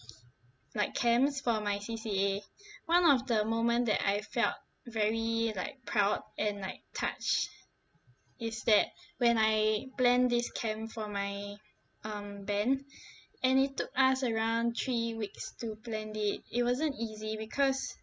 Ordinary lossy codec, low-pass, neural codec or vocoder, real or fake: none; none; none; real